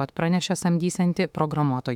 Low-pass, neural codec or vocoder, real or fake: 19.8 kHz; autoencoder, 48 kHz, 32 numbers a frame, DAC-VAE, trained on Japanese speech; fake